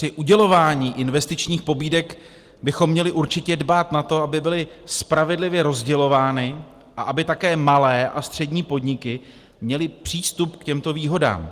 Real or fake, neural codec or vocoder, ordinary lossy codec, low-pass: real; none; Opus, 24 kbps; 14.4 kHz